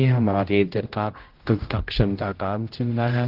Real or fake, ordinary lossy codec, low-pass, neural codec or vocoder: fake; Opus, 16 kbps; 5.4 kHz; codec, 16 kHz, 0.5 kbps, X-Codec, HuBERT features, trained on general audio